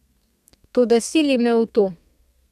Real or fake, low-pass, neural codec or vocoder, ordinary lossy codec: fake; 14.4 kHz; codec, 32 kHz, 1.9 kbps, SNAC; none